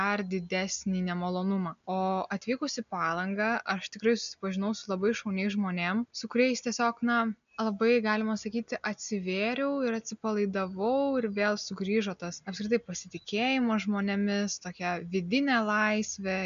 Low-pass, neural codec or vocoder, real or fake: 7.2 kHz; none; real